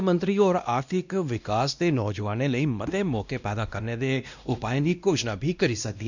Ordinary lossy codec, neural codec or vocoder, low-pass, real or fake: none; codec, 16 kHz, 1 kbps, X-Codec, WavLM features, trained on Multilingual LibriSpeech; 7.2 kHz; fake